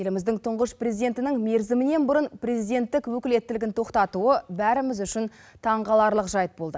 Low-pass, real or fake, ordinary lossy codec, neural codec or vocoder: none; real; none; none